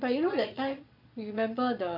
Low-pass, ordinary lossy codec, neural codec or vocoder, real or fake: 5.4 kHz; none; vocoder, 22.05 kHz, 80 mel bands, Vocos; fake